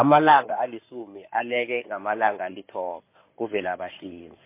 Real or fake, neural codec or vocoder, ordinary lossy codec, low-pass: fake; codec, 16 kHz in and 24 kHz out, 2.2 kbps, FireRedTTS-2 codec; MP3, 24 kbps; 3.6 kHz